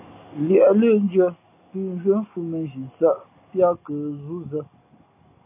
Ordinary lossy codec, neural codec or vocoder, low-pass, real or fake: AAC, 24 kbps; none; 3.6 kHz; real